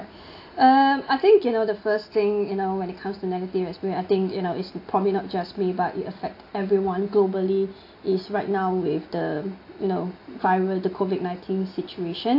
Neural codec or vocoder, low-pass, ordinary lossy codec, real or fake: none; 5.4 kHz; AAC, 32 kbps; real